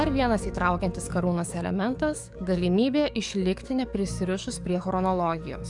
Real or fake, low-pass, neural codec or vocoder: fake; 10.8 kHz; codec, 44.1 kHz, 7.8 kbps, DAC